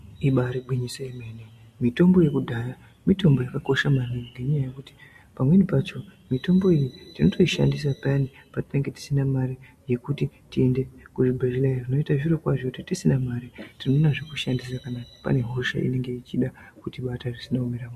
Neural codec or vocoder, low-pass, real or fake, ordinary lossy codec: none; 14.4 kHz; real; MP3, 64 kbps